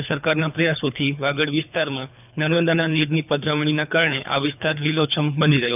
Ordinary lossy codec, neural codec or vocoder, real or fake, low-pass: none; codec, 24 kHz, 3 kbps, HILCodec; fake; 3.6 kHz